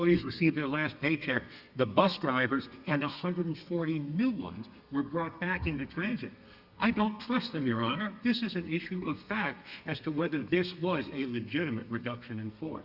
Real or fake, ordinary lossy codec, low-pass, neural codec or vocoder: fake; Opus, 64 kbps; 5.4 kHz; codec, 32 kHz, 1.9 kbps, SNAC